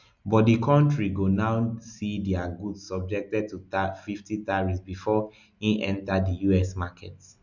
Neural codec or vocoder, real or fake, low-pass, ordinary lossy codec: none; real; 7.2 kHz; none